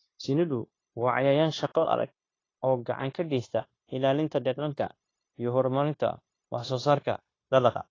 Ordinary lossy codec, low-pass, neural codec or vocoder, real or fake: AAC, 32 kbps; 7.2 kHz; codec, 16 kHz, 0.9 kbps, LongCat-Audio-Codec; fake